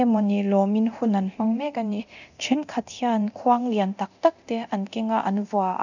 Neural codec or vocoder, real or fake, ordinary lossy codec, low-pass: codec, 24 kHz, 0.9 kbps, DualCodec; fake; none; 7.2 kHz